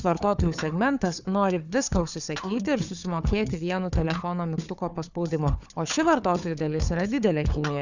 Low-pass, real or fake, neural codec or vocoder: 7.2 kHz; fake; codec, 16 kHz, 4 kbps, FunCodec, trained on Chinese and English, 50 frames a second